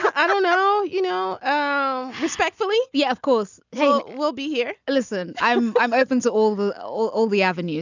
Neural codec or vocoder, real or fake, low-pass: none; real; 7.2 kHz